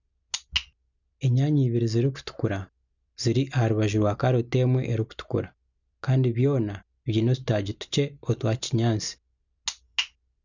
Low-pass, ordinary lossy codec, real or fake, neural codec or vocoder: 7.2 kHz; none; real; none